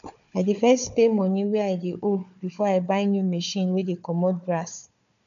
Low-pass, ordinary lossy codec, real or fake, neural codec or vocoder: 7.2 kHz; none; fake; codec, 16 kHz, 4 kbps, FunCodec, trained on Chinese and English, 50 frames a second